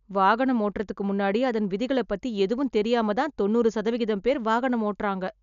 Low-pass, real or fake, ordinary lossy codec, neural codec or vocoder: 7.2 kHz; real; none; none